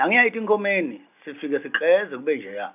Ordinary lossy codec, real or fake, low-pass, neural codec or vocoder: none; real; 3.6 kHz; none